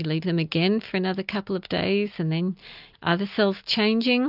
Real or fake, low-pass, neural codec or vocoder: real; 5.4 kHz; none